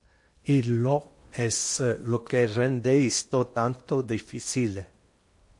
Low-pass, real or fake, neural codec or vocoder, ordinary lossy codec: 10.8 kHz; fake; codec, 16 kHz in and 24 kHz out, 0.8 kbps, FocalCodec, streaming, 65536 codes; MP3, 48 kbps